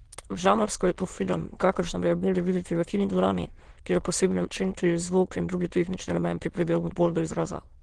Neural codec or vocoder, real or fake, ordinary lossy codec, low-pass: autoencoder, 22.05 kHz, a latent of 192 numbers a frame, VITS, trained on many speakers; fake; Opus, 16 kbps; 9.9 kHz